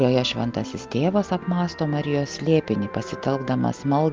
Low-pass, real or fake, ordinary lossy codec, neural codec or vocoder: 7.2 kHz; real; Opus, 32 kbps; none